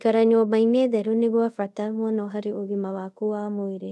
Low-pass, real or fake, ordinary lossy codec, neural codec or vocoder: none; fake; none; codec, 24 kHz, 0.5 kbps, DualCodec